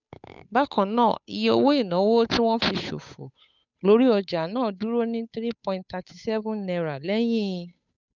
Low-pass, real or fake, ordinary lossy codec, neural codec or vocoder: 7.2 kHz; fake; none; codec, 16 kHz, 8 kbps, FunCodec, trained on Chinese and English, 25 frames a second